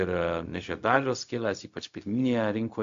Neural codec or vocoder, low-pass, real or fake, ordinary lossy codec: codec, 16 kHz, 0.4 kbps, LongCat-Audio-Codec; 7.2 kHz; fake; AAC, 64 kbps